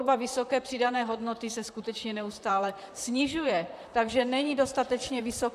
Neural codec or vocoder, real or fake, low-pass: vocoder, 44.1 kHz, 128 mel bands, Pupu-Vocoder; fake; 14.4 kHz